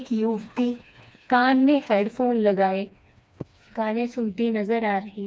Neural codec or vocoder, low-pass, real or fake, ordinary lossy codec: codec, 16 kHz, 2 kbps, FreqCodec, smaller model; none; fake; none